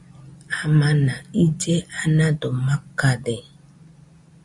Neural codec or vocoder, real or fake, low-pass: none; real; 10.8 kHz